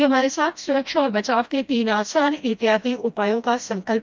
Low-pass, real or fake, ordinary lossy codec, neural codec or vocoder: none; fake; none; codec, 16 kHz, 1 kbps, FreqCodec, smaller model